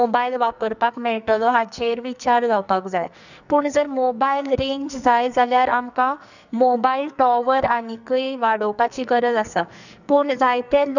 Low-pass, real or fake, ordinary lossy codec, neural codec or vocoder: 7.2 kHz; fake; none; codec, 44.1 kHz, 2.6 kbps, SNAC